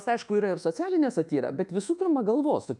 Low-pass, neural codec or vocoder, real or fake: 10.8 kHz; codec, 24 kHz, 1.2 kbps, DualCodec; fake